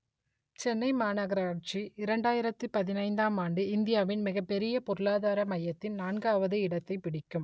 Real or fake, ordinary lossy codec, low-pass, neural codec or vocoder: real; none; none; none